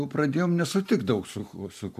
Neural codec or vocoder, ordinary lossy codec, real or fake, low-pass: none; MP3, 64 kbps; real; 14.4 kHz